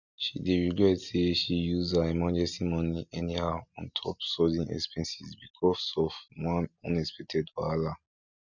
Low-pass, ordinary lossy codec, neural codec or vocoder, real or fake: 7.2 kHz; none; none; real